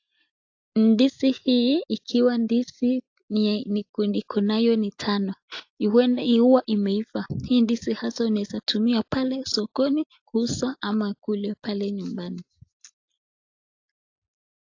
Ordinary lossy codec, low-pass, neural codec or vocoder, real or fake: AAC, 48 kbps; 7.2 kHz; none; real